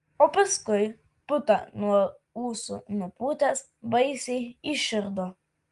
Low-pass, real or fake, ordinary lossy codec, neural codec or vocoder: 10.8 kHz; real; Opus, 32 kbps; none